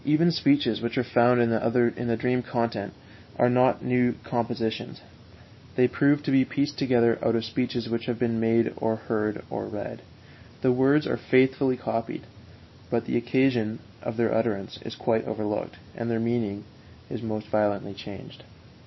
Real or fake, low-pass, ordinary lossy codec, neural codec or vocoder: real; 7.2 kHz; MP3, 24 kbps; none